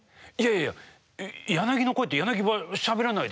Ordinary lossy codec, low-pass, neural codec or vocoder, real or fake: none; none; none; real